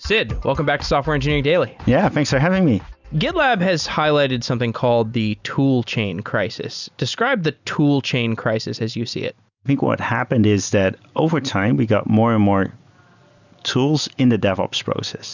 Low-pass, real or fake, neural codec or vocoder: 7.2 kHz; real; none